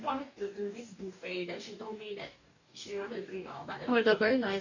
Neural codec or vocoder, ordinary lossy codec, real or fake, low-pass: codec, 44.1 kHz, 2.6 kbps, DAC; none; fake; 7.2 kHz